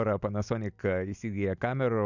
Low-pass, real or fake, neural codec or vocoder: 7.2 kHz; real; none